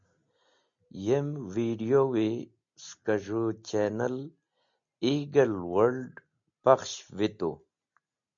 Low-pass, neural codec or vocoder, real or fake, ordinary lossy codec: 7.2 kHz; none; real; MP3, 64 kbps